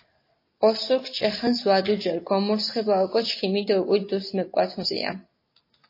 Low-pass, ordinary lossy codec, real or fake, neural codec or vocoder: 5.4 kHz; MP3, 24 kbps; real; none